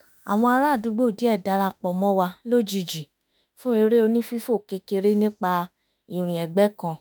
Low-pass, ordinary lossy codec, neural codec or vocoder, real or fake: none; none; autoencoder, 48 kHz, 32 numbers a frame, DAC-VAE, trained on Japanese speech; fake